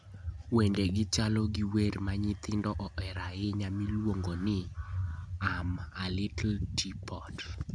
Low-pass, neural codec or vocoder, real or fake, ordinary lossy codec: 9.9 kHz; none; real; none